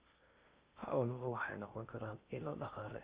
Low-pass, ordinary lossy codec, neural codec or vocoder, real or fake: 3.6 kHz; Opus, 24 kbps; codec, 16 kHz in and 24 kHz out, 0.6 kbps, FocalCodec, streaming, 4096 codes; fake